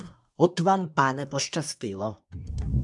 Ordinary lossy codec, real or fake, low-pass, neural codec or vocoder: MP3, 96 kbps; fake; 10.8 kHz; codec, 24 kHz, 1 kbps, SNAC